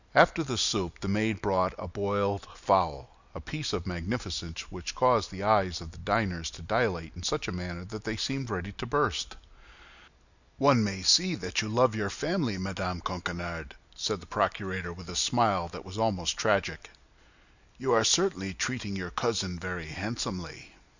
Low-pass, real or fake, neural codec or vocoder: 7.2 kHz; real; none